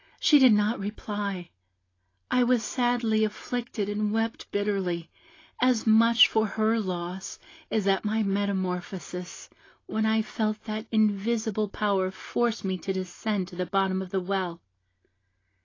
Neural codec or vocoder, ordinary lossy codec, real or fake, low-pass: none; AAC, 32 kbps; real; 7.2 kHz